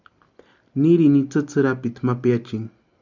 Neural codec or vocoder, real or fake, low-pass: none; real; 7.2 kHz